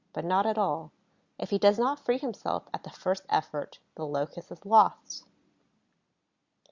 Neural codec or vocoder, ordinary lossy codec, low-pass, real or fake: none; Opus, 64 kbps; 7.2 kHz; real